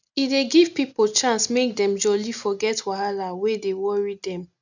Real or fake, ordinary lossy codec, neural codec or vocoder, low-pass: real; none; none; 7.2 kHz